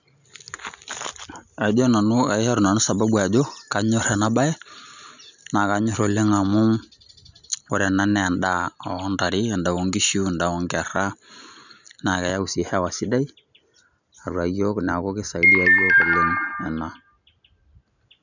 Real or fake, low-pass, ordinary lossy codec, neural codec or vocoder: real; 7.2 kHz; none; none